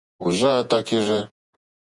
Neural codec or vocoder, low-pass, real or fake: vocoder, 48 kHz, 128 mel bands, Vocos; 10.8 kHz; fake